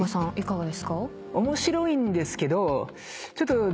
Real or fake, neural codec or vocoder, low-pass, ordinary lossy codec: real; none; none; none